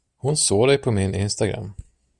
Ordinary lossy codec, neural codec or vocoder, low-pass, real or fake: Opus, 64 kbps; none; 10.8 kHz; real